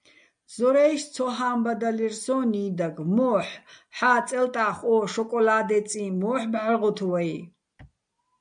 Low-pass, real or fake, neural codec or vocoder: 9.9 kHz; real; none